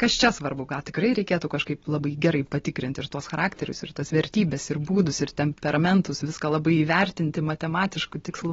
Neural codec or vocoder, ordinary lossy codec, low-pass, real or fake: none; AAC, 24 kbps; 7.2 kHz; real